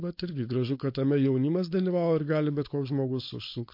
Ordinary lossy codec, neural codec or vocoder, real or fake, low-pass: MP3, 32 kbps; codec, 16 kHz, 4.8 kbps, FACodec; fake; 5.4 kHz